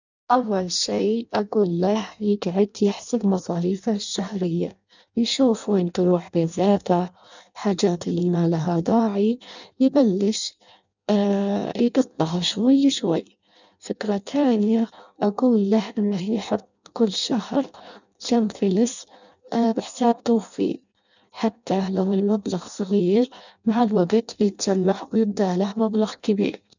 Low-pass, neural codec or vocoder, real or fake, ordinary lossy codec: 7.2 kHz; codec, 16 kHz in and 24 kHz out, 0.6 kbps, FireRedTTS-2 codec; fake; none